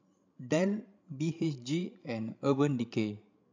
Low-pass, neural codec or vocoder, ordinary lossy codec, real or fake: 7.2 kHz; codec, 16 kHz, 16 kbps, FreqCodec, larger model; MP3, 64 kbps; fake